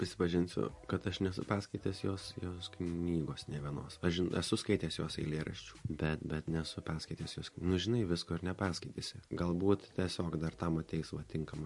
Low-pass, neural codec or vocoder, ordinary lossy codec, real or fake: 10.8 kHz; none; MP3, 48 kbps; real